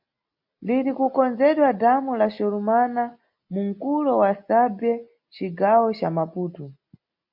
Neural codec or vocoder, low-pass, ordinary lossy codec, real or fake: none; 5.4 kHz; MP3, 48 kbps; real